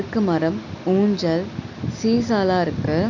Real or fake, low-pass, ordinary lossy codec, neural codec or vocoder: real; 7.2 kHz; none; none